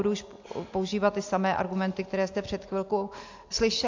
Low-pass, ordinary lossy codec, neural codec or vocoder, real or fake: 7.2 kHz; AAC, 48 kbps; none; real